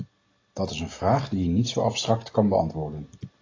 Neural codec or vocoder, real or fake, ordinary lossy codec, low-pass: none; real; AAC, 32 kbps; 7.2 kHz